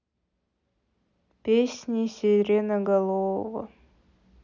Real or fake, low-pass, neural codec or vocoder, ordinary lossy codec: real; 7.2 kHz; none; none